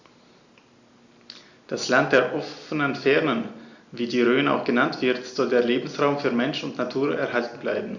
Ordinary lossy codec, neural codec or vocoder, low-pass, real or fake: Opus, 64 kbps; none; 7.2 kHz; real